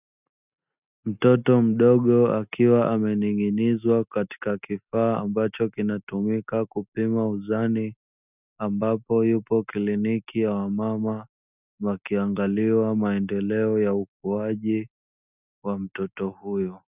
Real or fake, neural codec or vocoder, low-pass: real; none; 3.6 kHz